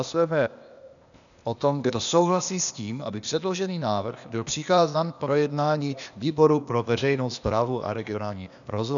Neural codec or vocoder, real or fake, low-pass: codec, 16 kHz, 0.8 kbps, ZipCodec; fake; 7.2 kHz